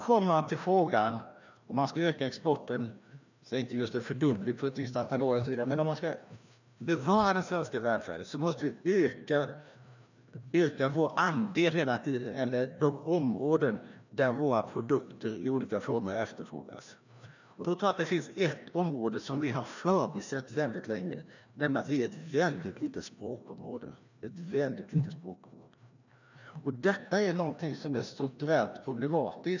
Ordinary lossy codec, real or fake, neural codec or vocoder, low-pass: none; fake; codec, 16 kHz, 1 kbps, FreqCodec, larger model; 7.2 kHz